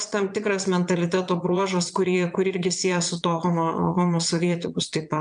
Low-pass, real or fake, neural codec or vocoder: 9.9 kHz; fake; vocoder, 22.05 kHz, 80 mel bands, Vocos